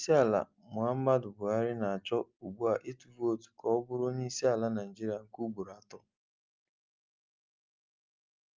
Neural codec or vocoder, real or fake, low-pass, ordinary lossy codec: none; real; 7.2 kHz; Opus, 24 kbps